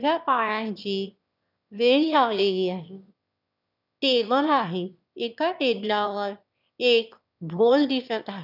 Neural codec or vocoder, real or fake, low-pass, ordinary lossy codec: autoencoder, 22.05 kHz, a latent of 192 numbers a frame, VITS, trained on one speaker; fake; 5.4 kHz; none